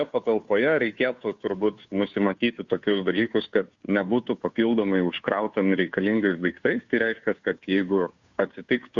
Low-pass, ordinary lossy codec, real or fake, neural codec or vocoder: 7.2 kHz; Opus, 64 kbps; fake; codec, 16 kHz, 2 kbps, FunCodec, trained on Chinese and English, 25 frames a second